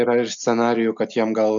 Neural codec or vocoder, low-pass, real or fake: none; 7.2 kHz; real